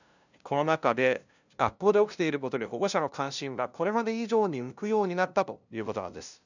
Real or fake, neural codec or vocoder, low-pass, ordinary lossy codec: fake; codec, 16 kHz, 0.5 kbps, FunCodec, trained on LibriTTS, 25 frames a second; 7.2 kHz; none